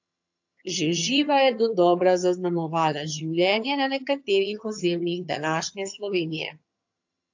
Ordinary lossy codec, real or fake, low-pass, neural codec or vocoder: AAC, 48 kbps; fake; 7.2 kHz; vocoder, 22.05 kHz, 80 mel bands, HiFi-GAN